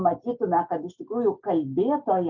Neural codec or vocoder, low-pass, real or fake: none; 7.2 kHz; real